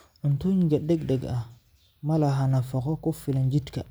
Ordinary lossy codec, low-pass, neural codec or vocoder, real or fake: none; none; none; real